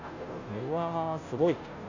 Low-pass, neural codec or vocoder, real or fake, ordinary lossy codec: 7.2 kHz; codec, 16 kHz, 0.5 kbps, FunCodec, trained on Chinese and English, 25 frames a second; fake; none